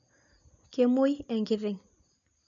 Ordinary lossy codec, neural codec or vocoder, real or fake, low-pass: MP3, 64 kbps; none; real; 7.2 kHz